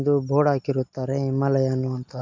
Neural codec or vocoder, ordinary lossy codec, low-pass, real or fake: none; MP3, 64 kbps; 7.2 kHz; real